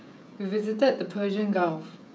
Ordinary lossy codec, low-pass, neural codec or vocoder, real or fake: none; none; codec, 16 kHz, 16 kbps, FreqCodec, smaller model; fake